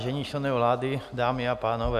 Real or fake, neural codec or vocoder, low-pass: real; none; 14.4 kHz